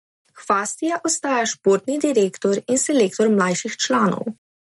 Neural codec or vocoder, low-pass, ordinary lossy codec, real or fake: none; 19.8 kHz; MP3, 48 kbps; real